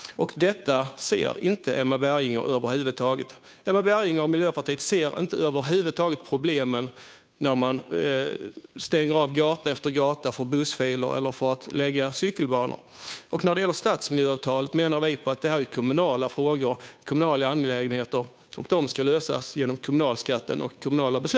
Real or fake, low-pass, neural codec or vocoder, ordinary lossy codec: fake; none; codec, 16 kHz, 2 kbps, FunCodec, trained on Chinese and English, 25 frames a second; none